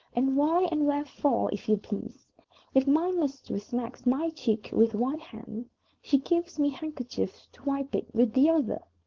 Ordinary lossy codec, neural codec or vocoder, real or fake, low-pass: Opus, 16 kbps; codec, 16 kHz, 4.8 kbps, FACodec; fake; 7.2 kHz